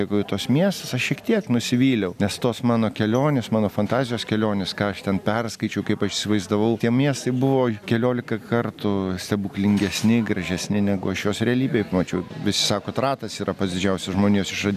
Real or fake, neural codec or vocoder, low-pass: real; none; 14.4 kHz